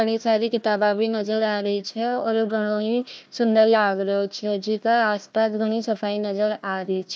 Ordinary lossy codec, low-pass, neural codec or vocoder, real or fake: none; none; codec, 16 kHz, 1 kbps, FunCodec, trained on Chinese and English, 50 frames a second; fake